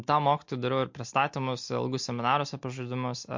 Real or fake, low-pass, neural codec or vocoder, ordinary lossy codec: real; 7.2 kHz; none; MP3, 48 kbps